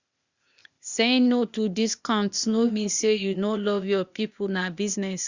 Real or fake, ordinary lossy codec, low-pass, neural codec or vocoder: fake; Opus, 64 kbps; 7.2 kHz; codec, 16 kHz, 0.8 kbps, ZipCodec